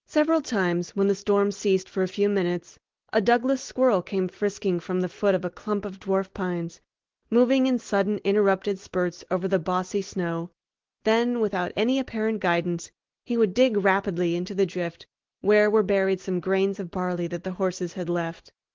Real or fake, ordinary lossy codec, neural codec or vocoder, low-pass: real; Opus, 16 kbps; none; 7.2 kHz